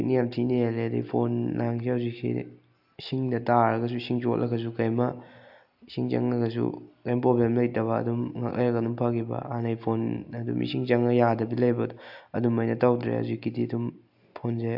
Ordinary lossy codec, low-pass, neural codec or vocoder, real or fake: AAC, 48 kbps; 5.4 kHz; none; real